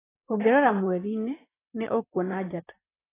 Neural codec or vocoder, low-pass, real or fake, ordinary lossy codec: vocoder, 44.1 kHz, 128 mel bands, Pupu-Vocoder; 3.6 kHz; fake; AAC, 16 kbps